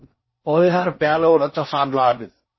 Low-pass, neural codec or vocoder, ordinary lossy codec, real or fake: 7.2 kHz; codec, 16 kHz in and 24 kHz out, 0.6 kbps, FocalCodec, streaming, 4096 codes; MP3, 24 kbps; fake